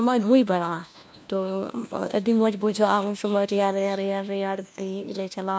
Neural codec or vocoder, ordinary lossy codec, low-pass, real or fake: codec, 16 kHz, 1 kbps, FunCodec, trained on LibriTTS, 50 frames a second; none; none; fake